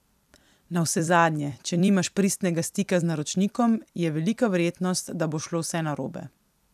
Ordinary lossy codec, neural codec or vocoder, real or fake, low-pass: none; vocoder, 44.1 kHz, 128 mel bands every 256 samples, BigVGAN v2; fake; 14.4 kHz